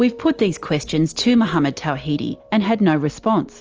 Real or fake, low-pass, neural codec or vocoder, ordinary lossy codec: real; 7.2 kHz; none; Opus, 24 kbps